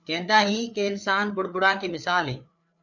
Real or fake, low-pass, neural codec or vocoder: fake; 7.2 kHz; codec, 16 kHz, 4 kbps, FreqCodec, larger model